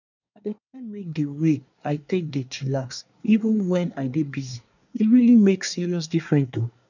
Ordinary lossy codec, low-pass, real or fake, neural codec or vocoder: none; 7.2 kHz; fake; codec, 24 kHz, 1 kbps, SNAC